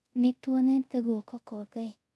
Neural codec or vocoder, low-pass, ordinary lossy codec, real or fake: codec, 24 kHz, 0.5 kbps, DualCodec; none; none; fake